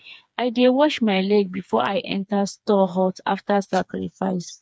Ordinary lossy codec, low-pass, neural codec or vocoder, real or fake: none; none; codec, 16 kHz, 4 kbps, FreqCodec, smaller model; fake